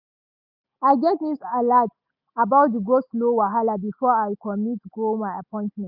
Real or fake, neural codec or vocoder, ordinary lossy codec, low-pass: real; none; none; 5.4 kHz